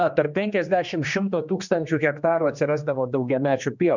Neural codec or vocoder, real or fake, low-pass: codec, 16 kHz, 2 kbps, X-Codec, HuBERT features, trained on general audio; fake; 7.2 kHz